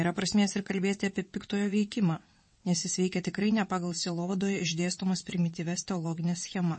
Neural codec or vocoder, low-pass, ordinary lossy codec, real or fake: vocoder, 22.05 kHz, 80 mel bands, WaveNeXt; 9.9 kHz; MP3, 32 kbps; fake